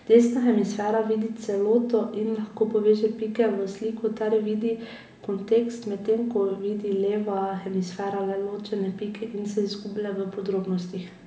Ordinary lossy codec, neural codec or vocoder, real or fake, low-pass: none; none; real; none